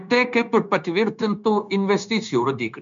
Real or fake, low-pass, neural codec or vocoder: fake; 7.2 kHz; codec, 16 kHz, 0.9 kbps, LongCat-Audio-Codec